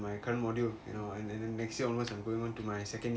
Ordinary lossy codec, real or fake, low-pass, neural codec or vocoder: none; real; none; none